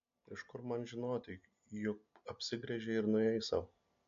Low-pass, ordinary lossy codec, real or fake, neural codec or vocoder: 7.2 kHz; AAC, 64 kbps; real; none